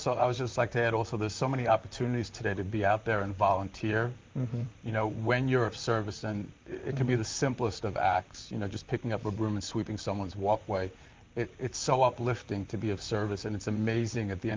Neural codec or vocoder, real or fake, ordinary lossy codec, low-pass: vocoder, 44.1 kHz, 128 mel bands, Pupu-Vocoder; fake; Opus, 24 kbps; 7.2 kHz